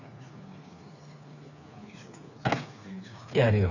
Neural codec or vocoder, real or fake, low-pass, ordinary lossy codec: codec, 16 kHz, 4 kbps, FreqCodec, smaller model; fake; 7.2 kHz; none